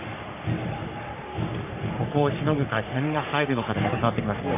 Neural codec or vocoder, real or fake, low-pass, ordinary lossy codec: codec, 44.1 kHz, 3.4 kbps, Pupu-Codec; fake; 3.6 kHz; AAC, 32 kbps